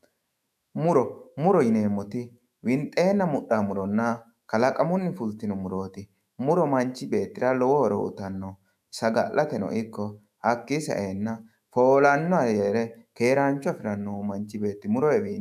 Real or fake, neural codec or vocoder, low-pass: fake; autoencoder, 48 kHz, 128 numbers a frame, DAC-VAE, trained on Japanese speech; 14.4 kHz